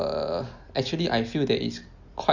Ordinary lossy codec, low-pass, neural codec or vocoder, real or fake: Opus, 64 kbps; 7.2 kHz; none; real